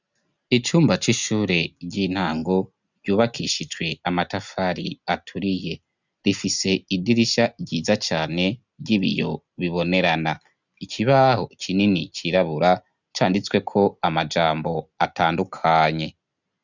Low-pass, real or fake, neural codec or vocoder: 7.2 kHz; real; none